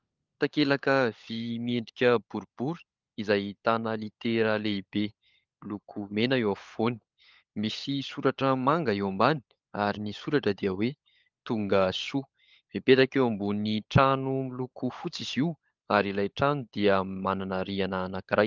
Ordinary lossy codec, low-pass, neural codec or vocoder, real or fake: Opus, 24 kbps; 7.2 kHz; codec, 16 kHz, 16 kbps, FunCodec, trained on LibriTTS, 50 frames a second; fake